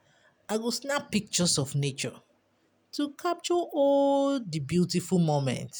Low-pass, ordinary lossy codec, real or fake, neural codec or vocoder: none; none; real; none